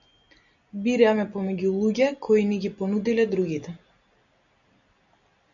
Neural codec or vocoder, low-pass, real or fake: none; 7.2 kHz; real